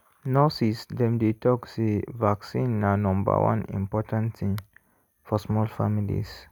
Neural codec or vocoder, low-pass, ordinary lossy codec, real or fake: none; none; none; real